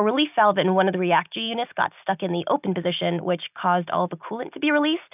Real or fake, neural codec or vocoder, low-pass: real; none; 3.6 kHz